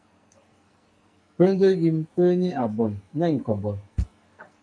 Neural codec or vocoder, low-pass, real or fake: codec, 44.1 kHz, 2.6 kbps, SNAC; 9.9 kHz; fake